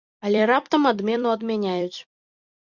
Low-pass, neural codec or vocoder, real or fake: 7.2 kHz; vocoder, 44.1 kHz, 128 mel bands every 256 samples, BigVGAN v2; fake